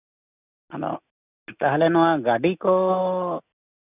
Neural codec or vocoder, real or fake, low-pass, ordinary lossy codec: none; real; 3.6 kHz; none